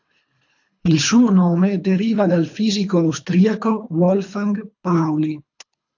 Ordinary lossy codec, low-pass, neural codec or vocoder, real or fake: AAC, 48 kbps; 7.2 kHz; codec, 24 kHz, 3 kbps, HILCodec; fake